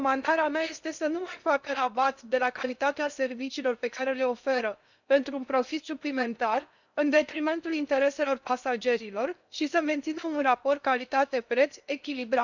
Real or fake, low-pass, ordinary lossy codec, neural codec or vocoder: fake; 7.2 kHz; none; codec, 16 kHz in and 24 kHz out, 0.8 kbps, FocalCodec, streaming, 65536 codes